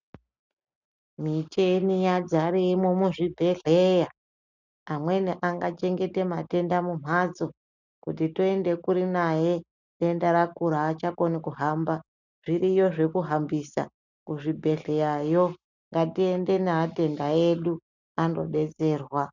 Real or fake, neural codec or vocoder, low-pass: real; none; 7.2 kHz